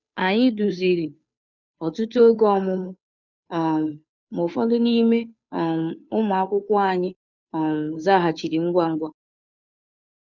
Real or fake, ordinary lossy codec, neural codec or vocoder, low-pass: fake; none; codec, 16 kHz, 2 kbps, FunCodec, trained on Chinese and English, 25 frames a second; 7.2 kHz